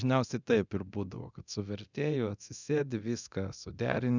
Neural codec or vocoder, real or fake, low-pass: codec, 24 kHz, 0.9 kbps, DualCodec; fake; 7.2 kHz